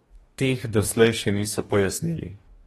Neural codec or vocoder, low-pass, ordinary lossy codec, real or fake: codec, 44.1 kHz, 2.6 kbps, DAC; 19.8 kHz; AAC, 32 kbps; fake